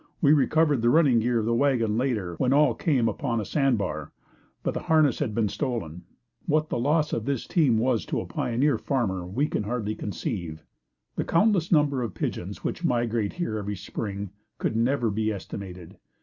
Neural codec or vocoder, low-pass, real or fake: none; 7.2 kHz; real